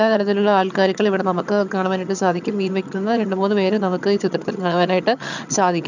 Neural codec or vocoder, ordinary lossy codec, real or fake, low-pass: vocoder, 22.05 kHz, 80 mel bands, HiFi-GAN; none; fake; 7.2 kHz